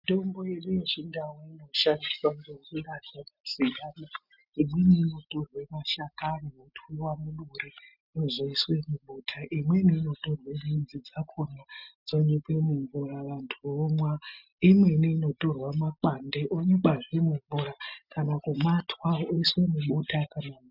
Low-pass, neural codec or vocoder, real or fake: 5.4 kHz; none; real